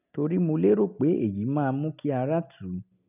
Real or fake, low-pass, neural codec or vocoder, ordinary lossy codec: real; 3.6 kHz; none; none